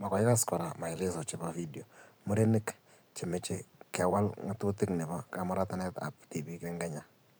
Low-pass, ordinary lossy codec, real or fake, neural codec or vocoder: none; none; fake; vocoder, 44.1 kHz, 128 mel bands every 512 samples, BigVGAN v2